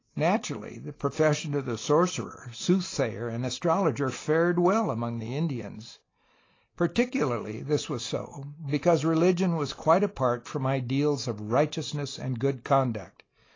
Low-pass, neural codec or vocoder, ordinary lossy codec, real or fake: 7.2 kHz; none; AAC, 32 kbps; real